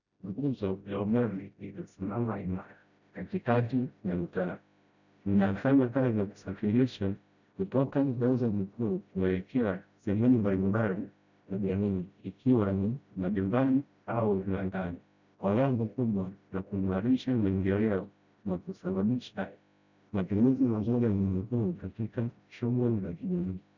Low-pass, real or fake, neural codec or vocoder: 7.2 kHz; fake; codec, 16 kHz, 0.5 kbps, FreqCodec, smaller model